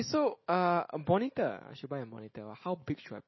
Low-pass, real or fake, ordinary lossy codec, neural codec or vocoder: 7.2 kHz; real; MP3, 24 kbps; none